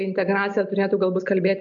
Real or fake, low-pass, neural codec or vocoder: real; 7.2 kHz; none